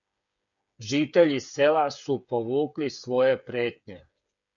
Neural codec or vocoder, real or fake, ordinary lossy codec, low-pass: codec, 16 kHz, 8 kbps, FreqCodec, smaller model; fake; MP3, 96 kbps; 7.2 kHz